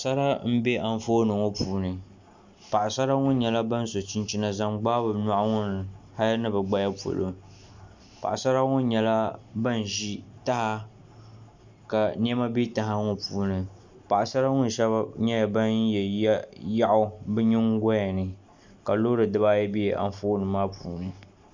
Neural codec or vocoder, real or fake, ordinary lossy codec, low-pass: none; real; MP3, 64 kbps; 7.2 kHz